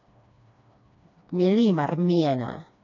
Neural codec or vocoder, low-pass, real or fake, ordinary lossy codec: codec, 16 kHz, 2 kbps, FreqCodec, smaller model; 7.2 kHz; fake; none